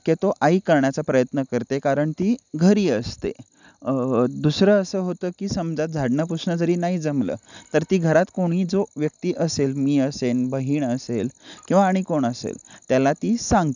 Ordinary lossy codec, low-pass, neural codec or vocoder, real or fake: none; 7.2 kHz; none; real